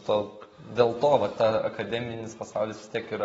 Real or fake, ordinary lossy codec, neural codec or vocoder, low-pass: real; AAC, 24 kbps; none; 19.8 kHz